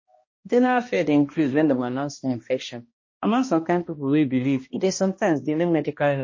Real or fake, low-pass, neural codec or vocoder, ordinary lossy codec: fake; 7.2 kHz; codec, 16 kHz, 1 kbps, X-Codec, HuBERT features, trained on balanced general audio; MP3, 32 kbps